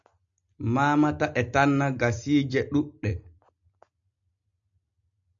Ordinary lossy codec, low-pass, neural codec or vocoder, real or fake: MP3, 64 kbps; 7.2 kHz; none; real